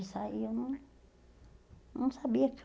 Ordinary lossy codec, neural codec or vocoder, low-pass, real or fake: none; none; none; real